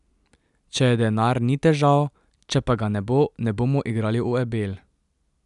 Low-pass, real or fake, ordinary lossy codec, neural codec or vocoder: 10.8 kHz; real; none; none